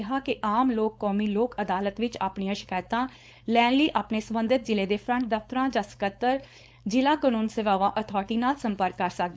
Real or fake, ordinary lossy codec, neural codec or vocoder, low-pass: fake; none; codec, 16 kHz, 4.8 kbps, FACodec; none